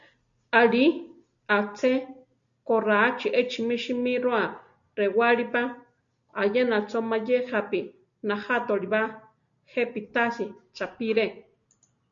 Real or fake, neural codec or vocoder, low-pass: real; none; 7.2 kHz